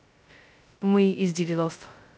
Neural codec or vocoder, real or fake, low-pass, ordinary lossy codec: codec, 16 kHz, 0.2 kbps, FocalCodec; fake; none; none